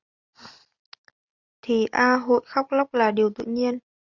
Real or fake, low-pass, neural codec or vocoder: real; 7.2 kHz; none